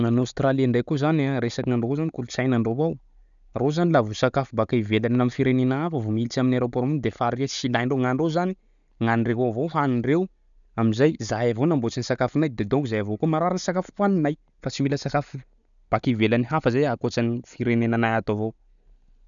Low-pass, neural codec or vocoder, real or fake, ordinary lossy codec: 7.2 kHz; none; real; none